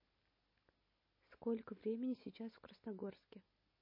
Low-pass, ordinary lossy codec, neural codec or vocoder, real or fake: 5.4 kHz; MP3, 24 kbps; none; real